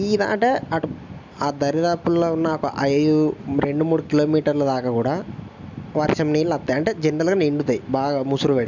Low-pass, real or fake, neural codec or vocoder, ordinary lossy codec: 7.2 kHz; real; none; none